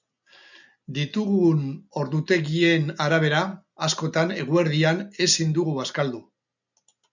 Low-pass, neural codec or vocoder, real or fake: 7.2 kHz; none; real